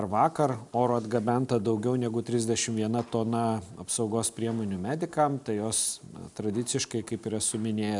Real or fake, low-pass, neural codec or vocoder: real; 10.8 kHz; none